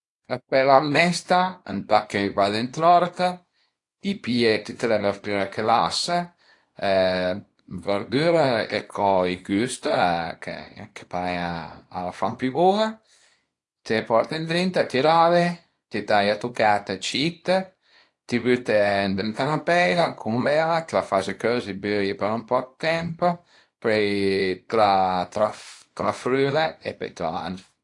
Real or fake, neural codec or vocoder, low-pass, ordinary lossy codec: fake; codec, 24 kHz, 0.9 kbps, WavTokenizer, small release; 10.8 kHz; AAC, 48 kbps